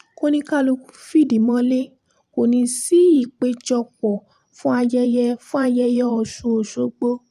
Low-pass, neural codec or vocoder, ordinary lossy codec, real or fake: 14.4 kHz; vocoder, 44.1 kHz, 128 mel bands every 512 samples, BigVGAN v2; none; fake